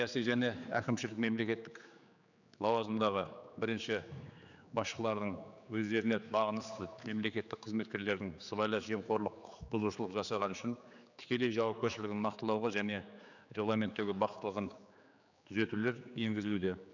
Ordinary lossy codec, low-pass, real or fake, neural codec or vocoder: none; 7.2 kHz; fake; codec, 16 kHz, 4 kbps, X-Codec, HuBERT features, trained on general audio